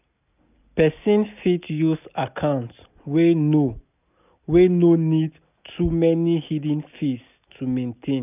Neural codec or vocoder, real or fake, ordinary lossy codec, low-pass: none; real; none; 3.6 kHz